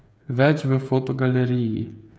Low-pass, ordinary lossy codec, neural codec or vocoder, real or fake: none; none; codec, 16 kHz, 8 kbps, FreqCodec, smaller model; fake